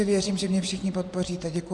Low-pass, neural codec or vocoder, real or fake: 10.8 kHz; vocoder, 24 kHz, 100 mel bands, Vocos; fake